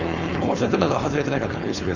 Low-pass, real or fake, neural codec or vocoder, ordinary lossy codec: 7.2 kHz; fake; codec, 16 kHz, 4.8 kbps, FACodec; none